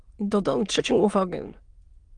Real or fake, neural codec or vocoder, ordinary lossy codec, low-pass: fake; autoencoder, 22.05 kHz, a latent of 192 numbers a frame, VITS, trained on many speakers; Opus, 24 kbps; 9.9 kHz